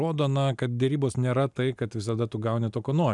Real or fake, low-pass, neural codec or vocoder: real; 10.8 kHz; none